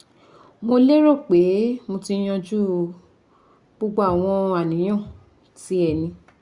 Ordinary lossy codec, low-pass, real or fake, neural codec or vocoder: Opus, 64 kbps; 10.8 kHz; real; none